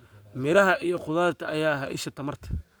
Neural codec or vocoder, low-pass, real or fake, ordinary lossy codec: codec, 44.1 kHz, 7.8 kbps, Pupu-Codec; none; fake; none